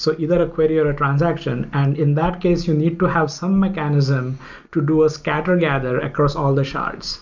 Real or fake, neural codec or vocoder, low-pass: real; none; 7.2 kHz